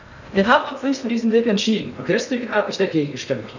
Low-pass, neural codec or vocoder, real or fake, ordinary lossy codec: 7.2 kHz; codec, 16 kHz in and 24 kHz out, 0.6 kbps, FocalCodec, streaming, 2048 codes; fake; none